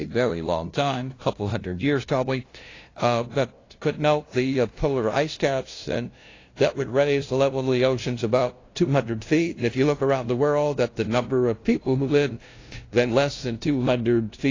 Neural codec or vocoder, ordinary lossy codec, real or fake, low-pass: codec, 16 kHz, 0.5 kbps, FunCodec, trained on LibriTTS, 25 frames a second; AAC, 32 kbps; fake; 7.2 kHz